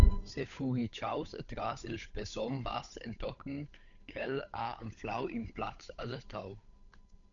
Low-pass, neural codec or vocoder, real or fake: 7.2 kHz; codec, 16 kHz, 8 kbps, FunCodec, trained on Chinese and English, 25 frames a second; fake